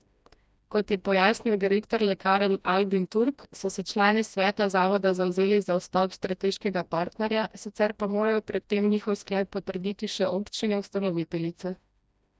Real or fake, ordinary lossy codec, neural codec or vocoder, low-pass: fake; none; codec, 16 kHz, 1 kbps, FreqCodec, smaller model; none